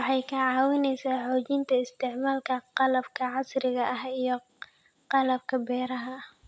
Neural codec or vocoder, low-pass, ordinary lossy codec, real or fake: none; none; none; real